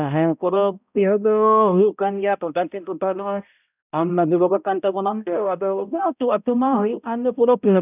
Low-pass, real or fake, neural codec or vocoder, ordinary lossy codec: 3.6 kHz; fake; codec, 16 kHz, 0.5 kbps, X-Codec, HuBERT features, trained on balanced general audio; none